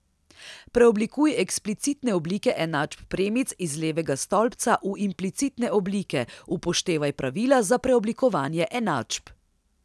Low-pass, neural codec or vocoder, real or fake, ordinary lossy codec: none; none; real; none